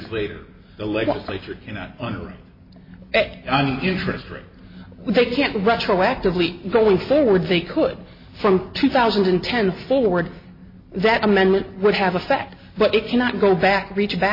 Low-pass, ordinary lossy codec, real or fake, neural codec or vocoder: 5.4 kHz; MP3, 24 kbps; real; none